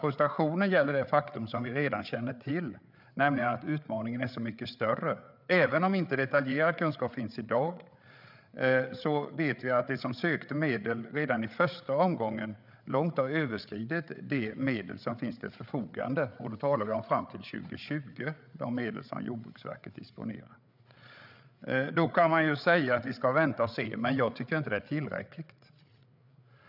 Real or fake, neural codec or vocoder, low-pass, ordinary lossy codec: fake; codec, 16 kHz, 8 kbps, FreqCodec, larger model; 5.4 kHz; none